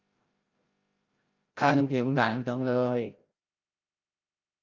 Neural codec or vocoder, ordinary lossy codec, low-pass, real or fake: codec, 16 kHz, 0.5 kbps, FreqCodec, larger model; Opus, 24 kbps; 7.2 kHz; fake